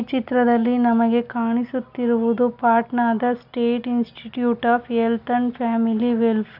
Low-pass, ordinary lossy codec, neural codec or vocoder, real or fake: 5.4 kHz; none; none; real